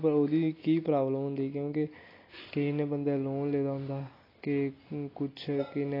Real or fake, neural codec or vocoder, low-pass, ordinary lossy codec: real; none; 5.4 kHz; AAC, 24 kbps